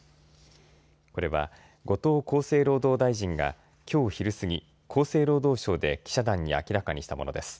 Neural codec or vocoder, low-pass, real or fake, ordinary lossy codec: none; none; real; none